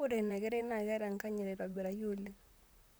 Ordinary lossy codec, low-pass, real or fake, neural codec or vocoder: none; none; fake; vocoder, 44.1 kHz, 128 mel bands, Pupu-Vocoder